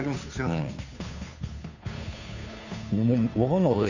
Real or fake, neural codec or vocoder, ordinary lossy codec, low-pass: fake; codec, 16 kHz, 2 kbps, FunCodec, trained on Chinese and English, 25 frames a second; none; 7.2 kHz